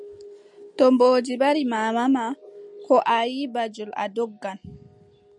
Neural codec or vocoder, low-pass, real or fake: none; 10.8 kHz; real